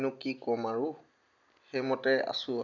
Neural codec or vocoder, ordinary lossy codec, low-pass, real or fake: none; MP3, 64 kbps; 7.2 kHz; real